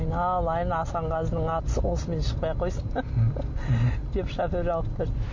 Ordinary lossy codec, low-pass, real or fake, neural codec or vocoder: MP3, 48 kbps; 7.2 kHz; real; none